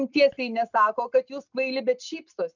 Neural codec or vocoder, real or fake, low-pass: none; real; 7.2 kHz